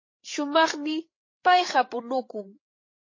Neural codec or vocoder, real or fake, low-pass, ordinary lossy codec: codec, 16 kHz, 8 kbps, FreqCodec, larger model; fake; 7.2 kHz; MP3, 32 kbps